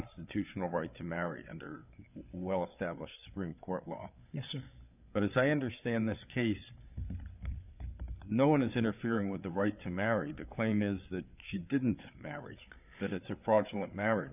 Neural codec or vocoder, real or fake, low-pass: codec, 16 kHz, 8 kbps, FreqCodec, larger model; fake; 3.6 kHz